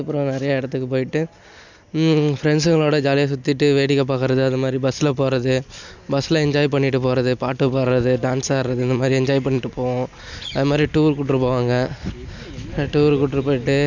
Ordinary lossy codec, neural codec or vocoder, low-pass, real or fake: none; none; 7.2 kHz; real